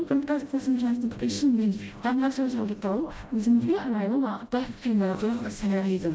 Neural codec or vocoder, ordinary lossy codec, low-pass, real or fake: codec, 16 kHz, 0.5 kbps, FreqCodec, smaller model; none; none; fake